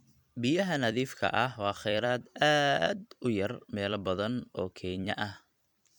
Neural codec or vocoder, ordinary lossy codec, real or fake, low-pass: vocoder, 44.1 kHz, 128 mel bands every 256 samples, BigVGAN v2; none; fake; 19.8 kHz